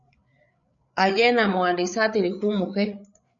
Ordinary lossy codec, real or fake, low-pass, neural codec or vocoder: MP3, 96 kbps; fake; 7.2 kHz; codec, 16 kHz, 8 kbps, FreqCodec, larger model